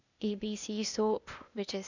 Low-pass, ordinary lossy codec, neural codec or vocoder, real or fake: 7.2 kHz; none; codec, 16 kHz, 0.8 kbps, ZipCodec; fake